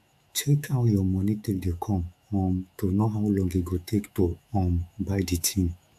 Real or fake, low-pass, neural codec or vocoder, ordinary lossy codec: fake; 14.4 kHz; autoencoder, 48 kHz, 128 numbers a frame, DAC-VAE, trained on Japanese speech; AAC, 96 kbps